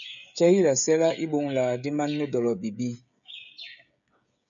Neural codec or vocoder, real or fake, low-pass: codec, 16 kHz, 16 kbps, FreqCodec, smaller model; fake; 7.2 kHz